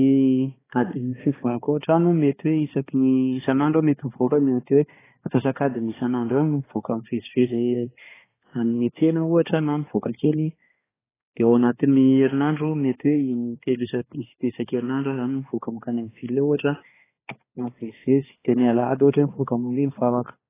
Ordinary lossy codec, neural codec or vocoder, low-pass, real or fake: AAC, 16 kbps; codec, 16 kHz, 2 kbps, X-Codec, HuBERT features, trained on balanced general audio; 3.6 kHz; fake